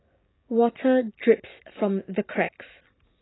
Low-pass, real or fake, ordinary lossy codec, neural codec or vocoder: 7.2 kHz; real; AAC, 16 kbps; none